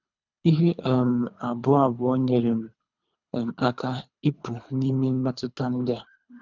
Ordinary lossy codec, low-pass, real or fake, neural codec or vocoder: none; 7.2 kHz; fake; codec, 24 kHz, 3 kbps, HILCodec